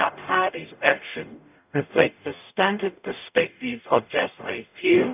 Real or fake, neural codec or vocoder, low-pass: fake; codec, 44.1 kHz, 0.9 kbps, DAC; 3.6 kHz